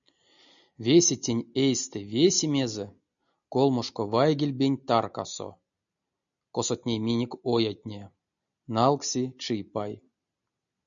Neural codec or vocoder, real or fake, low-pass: none; real; 7.2 kHz